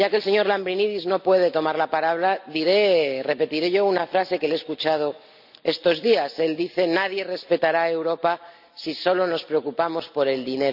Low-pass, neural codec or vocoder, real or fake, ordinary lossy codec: 5.4 kHz; none; real; none